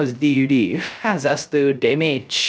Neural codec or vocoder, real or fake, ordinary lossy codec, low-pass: codec, 16 kHz, 0.3 kbps, FocalCodec; fake; none; none